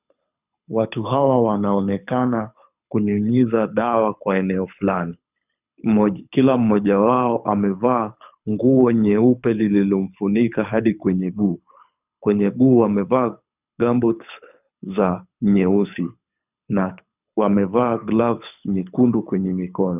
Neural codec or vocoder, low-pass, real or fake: codec, 24 kHz, 6 kbps, HILCodec; 3.6 kHz; fake